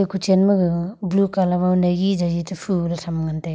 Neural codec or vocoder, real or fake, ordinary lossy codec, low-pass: none; real; none; none